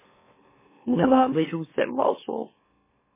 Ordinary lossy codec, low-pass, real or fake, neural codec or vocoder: MP3, 16 kbps; 3.6 kHz; fake; autoencoder, 44.1 kHz, a latent of 192 numbers a frame, MeloTTS